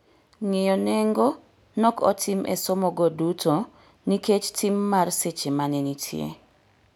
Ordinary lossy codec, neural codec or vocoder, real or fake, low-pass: none; none; real; none